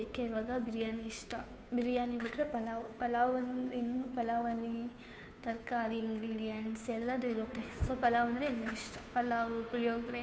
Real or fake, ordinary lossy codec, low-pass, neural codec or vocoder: fake; none; none; codec, 16 kHz, 2 kbps, FunCodec, trained on Chinese and English, 25 frames a second